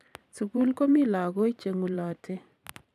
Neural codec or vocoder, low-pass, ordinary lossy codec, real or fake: vocoder, 44.1 kHz, 128 mel bands every 512 samples, BigVGAN v2; 14.4 kHz; none; fake